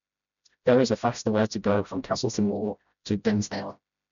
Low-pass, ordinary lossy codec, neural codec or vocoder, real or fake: 7.2 kHz; none; codec, 16 kHz, 0.5 kbps, FreqCodec, smaller model; fake